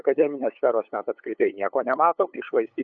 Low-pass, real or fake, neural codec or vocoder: 7.2 kHz; fake; codec, 16 kHz, 8 kbps, FunCodec, trained on LibriTTS, 25 frames a second